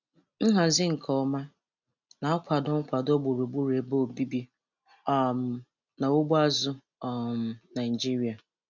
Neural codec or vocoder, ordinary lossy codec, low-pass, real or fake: none; none; 7.2 kHz; real